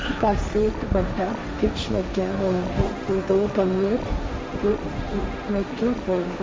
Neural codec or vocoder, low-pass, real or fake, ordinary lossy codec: codec, 16 kHz, 1.1 kbps, Voila-Tokenizer; none; fake; none